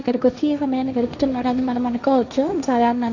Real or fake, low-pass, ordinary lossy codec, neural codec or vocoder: fake; 7.2 kHz; none; codec, 16 kHz, 1.1 kbps, Voila-Tokenizer